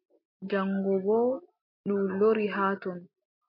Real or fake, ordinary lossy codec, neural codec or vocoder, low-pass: real; MP3, 32 kbps; none; 5.4 kHz